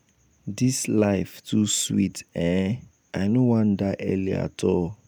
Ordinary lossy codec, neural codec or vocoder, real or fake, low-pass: none; none; real; none